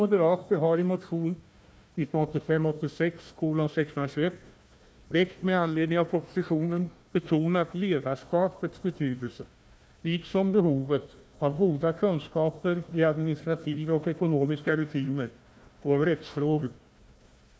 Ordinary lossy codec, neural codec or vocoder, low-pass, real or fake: none; codec, 16 kHz, 1 kbps, FunCodec, trained on Chinese and English, 50 frames a second; none; fake